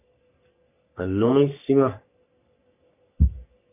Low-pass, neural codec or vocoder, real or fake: 3.6 kHz; codec, 44.1 kHz, 3.4 kbps, Pupu-Codec; fake